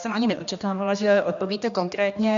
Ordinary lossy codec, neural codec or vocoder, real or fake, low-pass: MP3, 96 kbps; codec, 16 kHz, 1 kbps, X-Codec, HuBERT features, trained on general audio; fake; 7.2 kHz